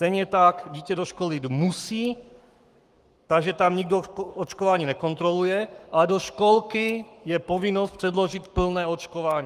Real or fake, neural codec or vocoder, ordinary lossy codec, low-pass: fake; codec, 44.1 kHz, 7.8 kbps, Pupu-Codec; Opus, 32 kbps; 14.4 kHz